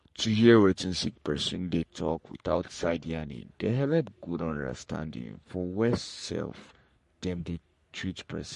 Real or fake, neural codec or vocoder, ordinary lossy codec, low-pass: fake; codec, 44.1 kHz, 3.4 kbps, Pupu-Codec; MP3, 48 kbps; 14.4 kHz